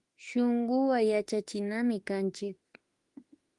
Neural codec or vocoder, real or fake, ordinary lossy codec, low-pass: autoencoder, 48 kHz, 32 numbers a frame, DAC-VAE, trained on Japanese speech; fake; Opus, 24 kbps; 10.8 kHz